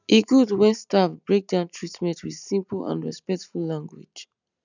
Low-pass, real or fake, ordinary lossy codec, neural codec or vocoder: 7.2 kHz; real; none; none